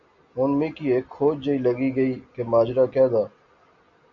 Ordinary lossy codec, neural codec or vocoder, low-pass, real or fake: MP3, 48 kbps; none; 7.2 kHz; real